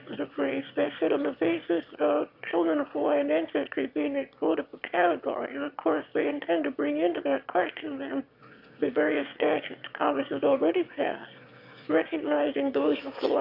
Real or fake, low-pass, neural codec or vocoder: fake; 5.4 kHz; autoencoder, 22.05 kHz, a latent of 192 numbers a frame, VITS, trained on one speaker